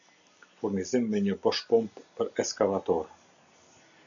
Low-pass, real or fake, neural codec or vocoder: 7.2 kHz; real; none